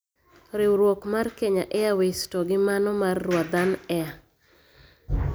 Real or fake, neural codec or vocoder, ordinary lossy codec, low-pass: real; none; none; none